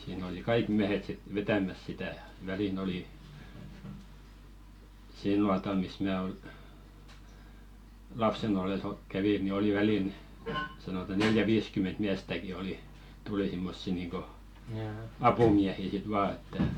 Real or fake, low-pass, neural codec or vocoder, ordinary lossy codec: real; 19.8 kHz; none; Opus, 64 kbps